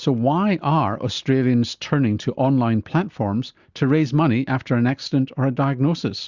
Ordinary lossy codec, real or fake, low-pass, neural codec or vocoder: Opus, 64 kbps; real; 7.2 kHz; none